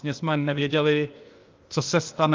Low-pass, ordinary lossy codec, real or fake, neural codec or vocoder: 7.2 kHz; Opus, 24 kbps; fake; codec, 16 kHz, 0.8 kbps, ZipCodec